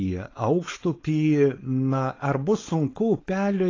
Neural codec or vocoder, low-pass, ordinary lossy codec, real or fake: codec, 16 kHz, 4.8 kbps, FACodec; 7.2 kHz; AAC, 32 kbps; fake